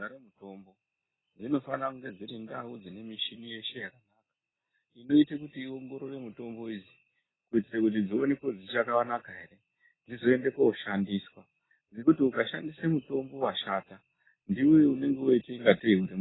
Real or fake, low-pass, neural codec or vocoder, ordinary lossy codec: real; 7.2 kHz; none; AAC, 16 kbps